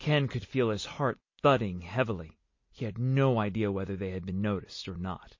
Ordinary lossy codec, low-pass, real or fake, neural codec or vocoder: MP3, 32 kbps; 7.2 kHz; real; none